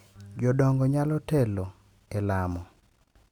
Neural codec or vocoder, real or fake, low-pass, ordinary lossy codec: none; real; 19.8 kHz; none